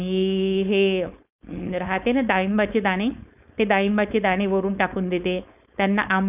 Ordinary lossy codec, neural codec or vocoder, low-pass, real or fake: none; codec, 16 kHz, 4.8 kbps, FACodec; 3.6 kHz; fake